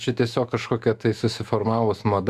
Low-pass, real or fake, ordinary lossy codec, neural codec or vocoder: 14.4 kHz; fake; Opus, 64 kbps; autoencoder, 48 kHz, 128 numbers a frame, DAC-VAE, trained on Japanese speech